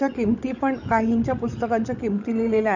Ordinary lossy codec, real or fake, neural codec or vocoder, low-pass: none; fake; codec, 16 kHz, 16 kbps, FreqCodec, larger model; 7.2 kHz